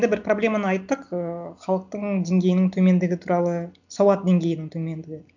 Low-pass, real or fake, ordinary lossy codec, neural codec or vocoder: 7.2 kHz; real; none; none